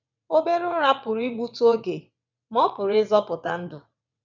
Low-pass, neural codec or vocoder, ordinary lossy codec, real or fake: 7.2 kHz; vocoder, 22.05 kHz, 80 mel bands, WaveNeXt; none; fake